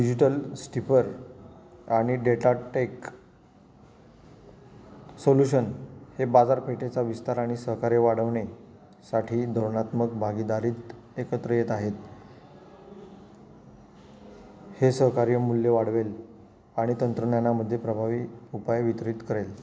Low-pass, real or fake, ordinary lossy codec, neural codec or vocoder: none; real; none; none